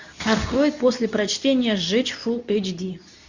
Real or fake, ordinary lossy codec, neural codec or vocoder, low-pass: fake; Opus, 64 kbps; codec, 24 kHz, 0.9 kbps, WavTokenizer, medium speech release version 2; 7.2 kHz